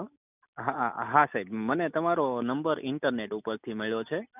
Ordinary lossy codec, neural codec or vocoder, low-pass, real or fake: none; none; 3.6 kHz; real